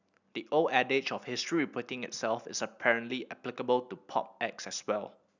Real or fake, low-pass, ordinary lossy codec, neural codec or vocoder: real; 7.2 kHz; none; none